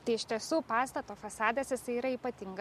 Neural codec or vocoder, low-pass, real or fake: none; 14.4 kHz; real